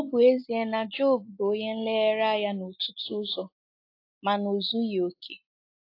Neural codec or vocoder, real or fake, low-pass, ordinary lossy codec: none; real; 5.4 kHz; AAC, 32 kbps